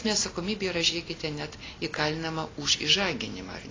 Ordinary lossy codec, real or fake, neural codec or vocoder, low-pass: AAC, 32 kbps; real; none; 7.2 kHz